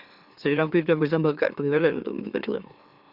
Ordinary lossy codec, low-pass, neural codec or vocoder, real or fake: Opus, 64 kbps; 5.4 kHz; autoencoder, 44.1 kHz, a latent of 192 numbers a frame, MeloTTS; fake